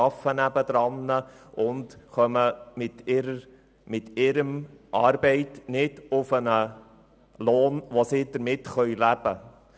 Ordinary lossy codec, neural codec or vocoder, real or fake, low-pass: none; none; real; none